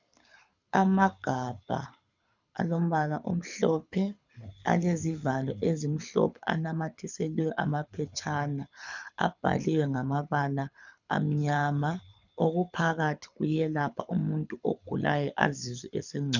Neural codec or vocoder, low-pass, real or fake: codec, 24 kHz, 6 kbps, HILCodec; 7.2 kHz; fake